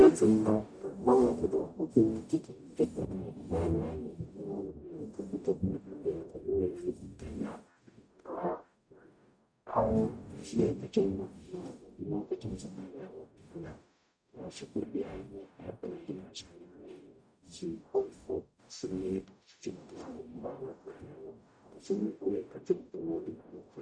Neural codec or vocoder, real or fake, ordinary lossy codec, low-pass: codec, 44.1 kHz, 0.9 kbps, DAC; fake; MP3, 96 kbps; 9.9 kHz